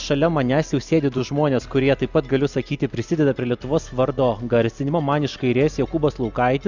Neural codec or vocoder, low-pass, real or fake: none; 7.2 kHz; real